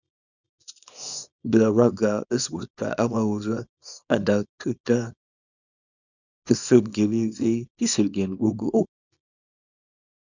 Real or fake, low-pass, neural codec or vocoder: fake; 7.2 kHz; codec, 24 kHz, 0.9 kbps, WavTokenizer, small release